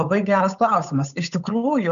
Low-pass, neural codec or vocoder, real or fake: 7.2 kHz; codec, 16 kHz, 8 kbps, FunCodec, trained on Chinese and English, 25 frames a second; fake